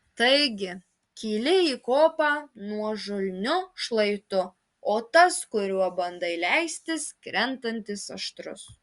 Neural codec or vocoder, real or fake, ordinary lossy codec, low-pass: none; real; Opus, 64 kbps; 10.8 kHz